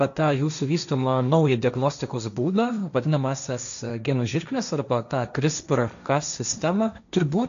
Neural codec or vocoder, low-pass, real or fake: codec, 16 kHz, 1.1 kbps, Voila-Tokenizer; 7.2 kHz; fake